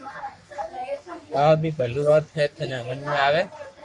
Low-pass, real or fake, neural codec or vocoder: 10.8 kHz; fake; codec, 44.1 kHz, 3.4 kbps, Pupu-Codec